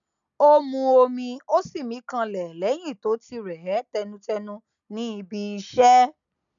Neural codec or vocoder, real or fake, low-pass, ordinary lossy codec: none; real; 7.2 kHz; none